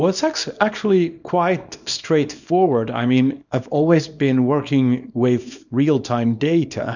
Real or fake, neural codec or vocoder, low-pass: fake; codec, 24 kHz, 0.9 kbps, WavTokenizer, small release; 7.2 kHz